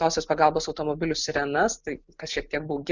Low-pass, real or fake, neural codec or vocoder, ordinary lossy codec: 7.2 kHz; real; none; Opus, 64 kbps